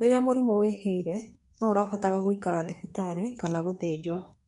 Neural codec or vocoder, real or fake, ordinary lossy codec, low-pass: codec, 24 kHz, 1 kbps, SNAC; fake; none; 10.8 kHz